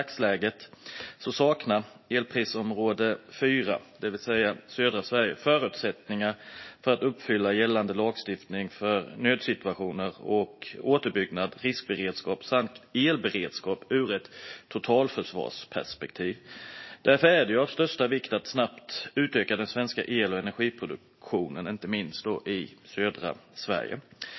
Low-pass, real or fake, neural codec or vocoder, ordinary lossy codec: 7.2 kHz; real; none; MP3, 24 kbps